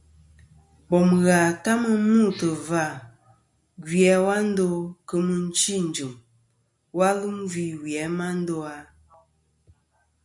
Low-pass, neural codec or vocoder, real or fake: 10.8 kHz; none; real